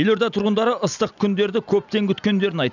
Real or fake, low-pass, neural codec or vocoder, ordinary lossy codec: real; 7.2 kHz; none; none